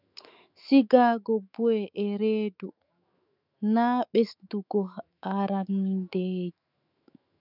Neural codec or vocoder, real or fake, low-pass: autoencoder, 48 kHz, 128 numbers a frame, DAC-VAE, trained on Japanese speech; fake; 5.4 kHz